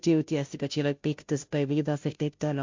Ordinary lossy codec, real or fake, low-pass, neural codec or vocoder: MP3, 48 kbps; fake; 7.2 kHz; codec, 16 kHz, 0.5 kbps, FunCodec, trained on Chinese and English, 25 frames a second